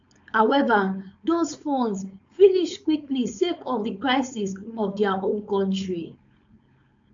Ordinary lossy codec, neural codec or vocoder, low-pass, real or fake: AAC, 64 kbps; codec, 16 kHz, 4.8 kbps, FACodec; 7.2 kHz; fake